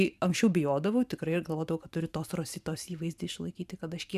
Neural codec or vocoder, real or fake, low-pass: vocoder, 44.1 kHz, 128 mel bands every 512 samples, BigVGAN v2; fake; 14.4 kHz